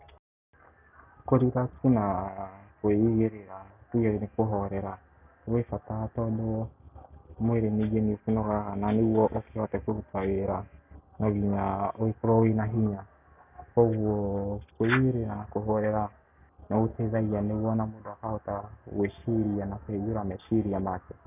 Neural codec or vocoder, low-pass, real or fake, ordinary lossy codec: none; 3.6 kHz; real; none